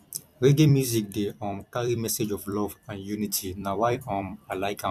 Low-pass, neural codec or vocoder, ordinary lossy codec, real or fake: 14.4 kHz; vocoder, 44.1 kHz, 128 mel bands every 256 samples, BigVGAN v2; none; fake